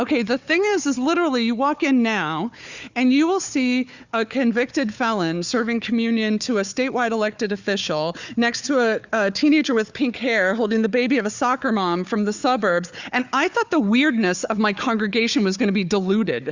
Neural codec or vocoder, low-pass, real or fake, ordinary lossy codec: codec, 16 kHz, 4 kbps, FunCodec, trained on Chinese and English, 50 frames a second; 7.2 kHz; fake; Opus, 64 kbps